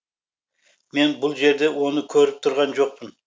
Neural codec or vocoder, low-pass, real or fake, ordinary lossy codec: none; none; real; none